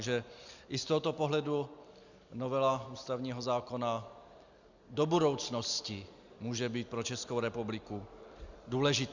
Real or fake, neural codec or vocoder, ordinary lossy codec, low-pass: real; none; Opus, 64 kbps; 7.2 kHz